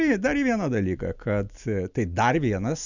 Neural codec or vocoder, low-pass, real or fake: none; 7.2 kHz; real